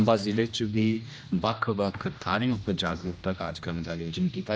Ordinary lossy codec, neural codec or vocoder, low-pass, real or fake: none; codec, 16 kHz, 1 kbps, X-Codec, HuBERT features, trained on general audio; none; fake